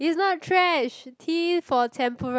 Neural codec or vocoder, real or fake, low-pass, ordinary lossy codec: none; real; none; none